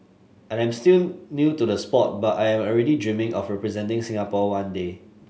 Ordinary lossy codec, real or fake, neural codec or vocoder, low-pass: none; real; none; none